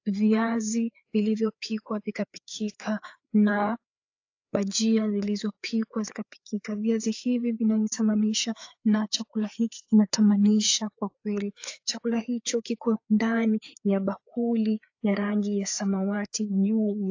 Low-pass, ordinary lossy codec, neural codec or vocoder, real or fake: 7.2 kHz; AAC, 48 kbps; codec, 16 kHz, 4 kbps, FreqCodec, larger model; fake